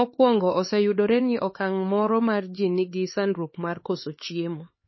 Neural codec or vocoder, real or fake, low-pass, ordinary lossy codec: codec, 16 kHz, 4 kbps, X-Codec, HuBERT features, trained on LibriSpeech; fake; 7.2 kHz; MP3, 24 kbps